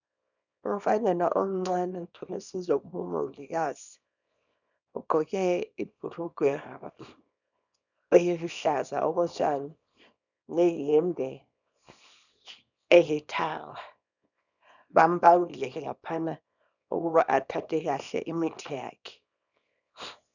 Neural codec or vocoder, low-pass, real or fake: codec, 24 kHz, 0.9 kbps, WavTokenizer, small release; 7.2 kHz; fake